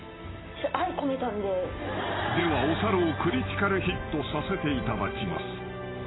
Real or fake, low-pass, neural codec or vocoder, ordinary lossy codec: real; 7.2 kHz; none; AAC, 16 kbps